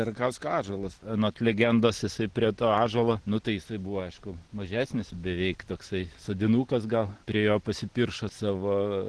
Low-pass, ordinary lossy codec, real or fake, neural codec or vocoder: 10.8 kHz; Opus, 16 kbps; real; none